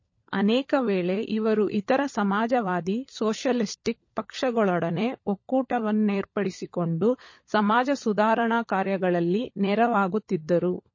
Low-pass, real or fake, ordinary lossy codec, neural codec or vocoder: 7.2 kHz; fake; MP3, 32 kbps; codec, 16 kHz, 16 kbps, FunCodec, trained on LibriTTS, 50 frames a second